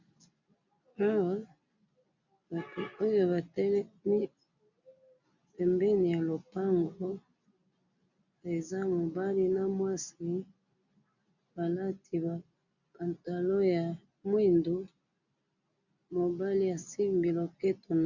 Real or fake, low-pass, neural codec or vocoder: real; 7.2 kHz; none